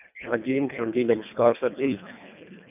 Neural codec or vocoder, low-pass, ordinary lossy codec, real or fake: codec, 24 kHz, 1.5 kbps, HILCodec; 3.6 kHz; none; fake